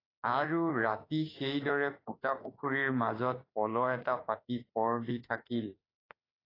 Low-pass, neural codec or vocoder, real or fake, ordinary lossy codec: 5.4 kHz; autoencoder, 48 kHz, 32 numbers a frame, DAC-VAE, trained on Japanese speech; fake; AAC, 24 kbps